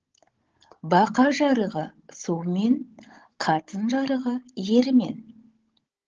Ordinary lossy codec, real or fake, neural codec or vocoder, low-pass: Opus, 16 kbps; fake; codec, 16 kHz, 16 kbps, FunCodec, trained on Chinese and English, 50 frames a second; 7.2 kHz